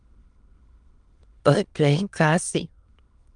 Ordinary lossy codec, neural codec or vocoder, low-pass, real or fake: Opus, 32 kbps; autoencoder, 22.05 kHz, a latent of 192 numbers a frame, VITS, trained on many speakers; 9.9 kHz; fake